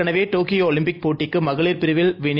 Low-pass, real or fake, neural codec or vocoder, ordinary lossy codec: 5.4 kHz; real; none; none